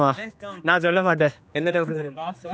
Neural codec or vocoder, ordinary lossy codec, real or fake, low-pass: codec, 16 kHz, 2 kbps, X-Codec, HuBERT features, trained on general audio; none; fake; none